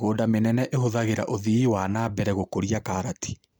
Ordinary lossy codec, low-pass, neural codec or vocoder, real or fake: none; none; none; real